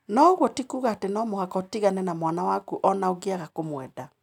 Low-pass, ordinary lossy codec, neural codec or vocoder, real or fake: 19.8 kHz; none; none; real